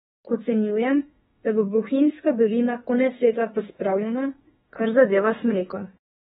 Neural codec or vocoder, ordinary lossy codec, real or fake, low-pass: autoencoder, 48 kHz, 32 numbers a frame, DAC-VAE, trained on Japanese speech; AAC, 16 kbps; fake; 19.8 kHz